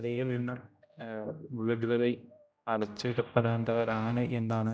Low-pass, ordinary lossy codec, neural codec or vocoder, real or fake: none; none; codec, 16 kHz, 0.5 kbps, X-Codec, HuBERT features, trained on general audio; fake